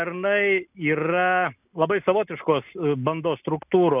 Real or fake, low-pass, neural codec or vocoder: real; 3.6 kHz; none